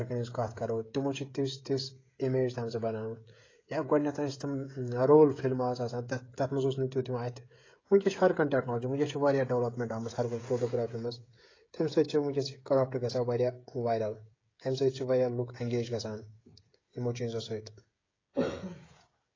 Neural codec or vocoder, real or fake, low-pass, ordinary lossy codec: codec, 16 kHz, 16 kbps, FreqCodec, smaller model; fake; 7.2 kHz; AAC, 32 kbps